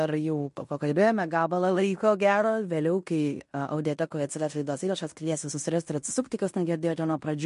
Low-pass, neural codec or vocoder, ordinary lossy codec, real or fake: 10.8 kHz; codec, 16 kHz in and 24 kHz out, 0.9 kbps, LongCat-Audio-Codec, fine tuned four codebook decoder; MP3, 48 kbps; fake